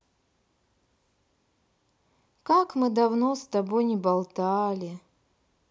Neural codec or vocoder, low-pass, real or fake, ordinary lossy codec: none; none; real; none